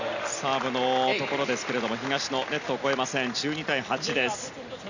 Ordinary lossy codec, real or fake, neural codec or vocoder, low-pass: none; real; none; 7.2 kHz